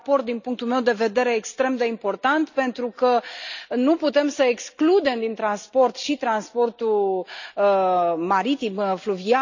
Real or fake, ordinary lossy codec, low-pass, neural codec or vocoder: real; none; 7.2 kHz; none